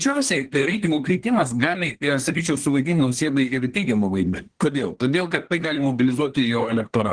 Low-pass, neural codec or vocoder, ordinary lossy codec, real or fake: 9.9 kHz; codec, 24 kHz, 1 kbps, SNAC; Opus, 16 kbps; fake